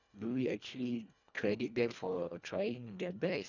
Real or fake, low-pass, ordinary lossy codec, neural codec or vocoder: fake; 7.2 kHz; none; codec, 24 kHz, 1.5 kbps, HILCodec